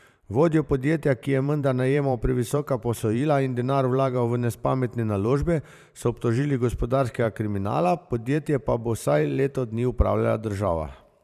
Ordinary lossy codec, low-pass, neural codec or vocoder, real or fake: none; 14.4 kHz; vocoder, 44.1 kHz, 128 mel bands every 512 samples, BigVGAN v2; fake